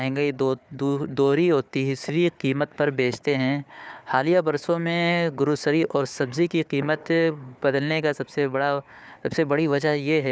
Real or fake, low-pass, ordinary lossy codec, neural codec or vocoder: fake; none; none; codec, 16 kHz, 4 kbps, FunCodec, trained on Chinese and English, 50 frames a second